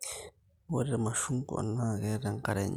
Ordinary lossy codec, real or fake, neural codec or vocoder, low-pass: none; real; none; 19.8 kHz